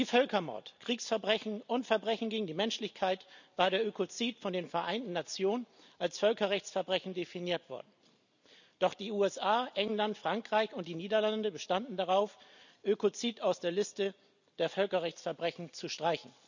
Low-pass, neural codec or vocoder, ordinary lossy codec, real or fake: 7.2 kHz; none; none; real